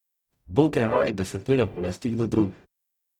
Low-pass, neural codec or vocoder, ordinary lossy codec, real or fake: 19.8 kHz; codec, 44.1 kHz, 0.9 kbps, DAC; none; fake